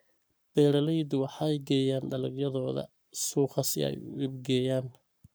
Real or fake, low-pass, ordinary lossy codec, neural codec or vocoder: fake; none; none; codec, 44.1 kHz, 7.8 kbps, Pupu-Codec